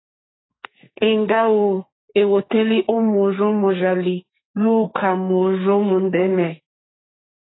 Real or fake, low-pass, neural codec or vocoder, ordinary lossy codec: fake; 7.2 kHz; codec, 44.1 kHz, 2.6 kbps, SNAC; AAC, 16 kbps